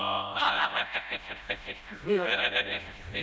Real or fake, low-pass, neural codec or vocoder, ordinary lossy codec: fake; none; codec, 16 kHz, 0.5 kbps, FreqCodec, smaller model; none